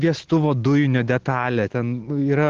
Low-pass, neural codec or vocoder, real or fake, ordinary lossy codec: 7.2 kHz; none; real; Opus, 16 kbps